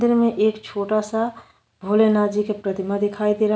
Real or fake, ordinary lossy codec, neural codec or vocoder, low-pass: real; none; none; none